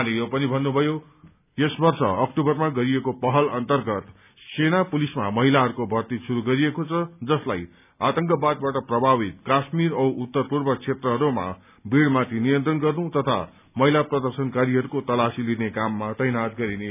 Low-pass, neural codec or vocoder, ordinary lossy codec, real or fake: 3.6 kHz; none; none; real